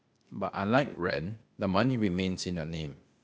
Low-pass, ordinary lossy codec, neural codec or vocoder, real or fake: none; none; codec, 16 kHz, 0.8 kbps, ZipCodec; fake